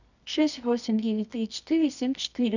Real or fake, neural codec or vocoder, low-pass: fake; codec, 24 kHz, 0.9 kbps, WavTokenizer, medium music audio release; 7.2 kHz